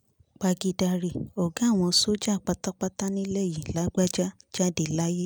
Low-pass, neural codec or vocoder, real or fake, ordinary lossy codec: none; none; real; none